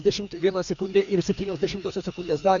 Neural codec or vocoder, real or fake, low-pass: codec, 16 kHz, 2 kbps, FreqCodec, larger model; fake; 7.2 kHz